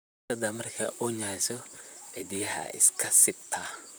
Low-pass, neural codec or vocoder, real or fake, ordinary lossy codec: none; vocoder, 44.1 kHz, 128 mel bands, Pupu-Vocoder; fake; none